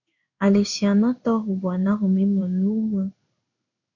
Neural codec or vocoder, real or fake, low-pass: codec, 16 kHz in and 24 kHz out, 1 kbps, XY-Tokenizer; fake; 7.2 kHz